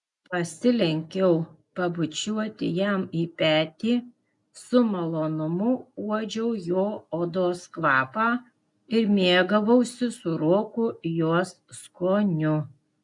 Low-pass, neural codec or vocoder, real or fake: 10.8 kHz; none; real